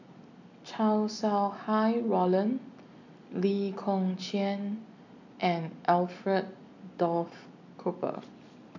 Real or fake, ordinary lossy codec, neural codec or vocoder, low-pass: real; none; none; 7.2 kHz